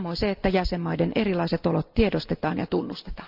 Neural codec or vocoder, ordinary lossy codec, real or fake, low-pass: none; Opus, 24 kbps; real; 5.4 kHz